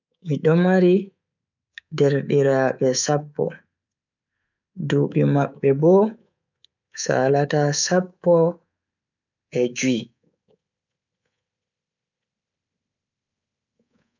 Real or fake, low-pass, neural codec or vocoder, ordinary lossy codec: fake; 7.2 kHz; codec, 24 kHz, 3.1 kbps, DualCodec; none